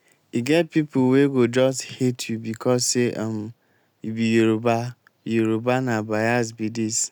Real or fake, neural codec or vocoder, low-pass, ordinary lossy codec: real; none; none; none